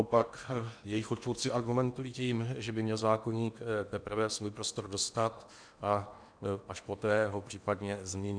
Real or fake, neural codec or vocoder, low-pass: fake; codec, 16 kHz in and 24 kHz out, 0.8 kbps, FocalCodec, streaming, 65536 codes; 9.9 kHz